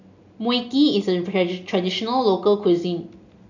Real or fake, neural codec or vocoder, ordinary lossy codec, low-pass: real; none; none; 7.2 kHz